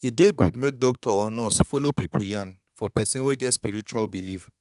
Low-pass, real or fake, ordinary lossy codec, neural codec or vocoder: 10.8 kHz; fake; none; codec, 24 kHz, 1 kbps, SNAC